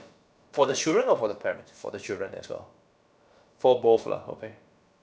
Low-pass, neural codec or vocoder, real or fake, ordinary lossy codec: none; codec, 16 kHz, about 1 kbps, DyCAST, with the encoder's durations; fake; none